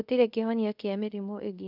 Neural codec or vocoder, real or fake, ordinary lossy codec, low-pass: codec, 24 kHz, 0.5 kbps, DualCodec; fake; none; 5.4 kHz